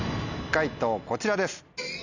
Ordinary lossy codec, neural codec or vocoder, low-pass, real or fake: none; none; 7.2 kHz; real